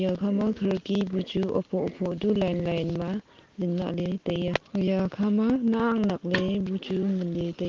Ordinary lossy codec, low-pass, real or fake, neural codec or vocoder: Opus, 16 kbps; 7.2 kHz; real; none